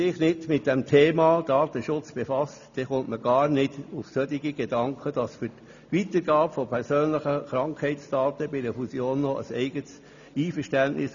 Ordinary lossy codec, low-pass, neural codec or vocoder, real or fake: none; 7.2 kHz; none; real